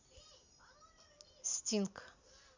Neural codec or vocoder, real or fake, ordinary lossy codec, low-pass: none; real; Opus, 64 kbps; 7.2 kHz